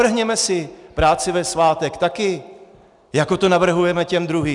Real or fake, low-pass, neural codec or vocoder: real; 10.8 kHz; none